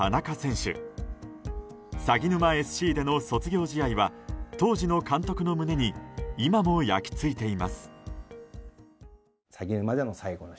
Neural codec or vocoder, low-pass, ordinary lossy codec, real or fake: none; none; none; real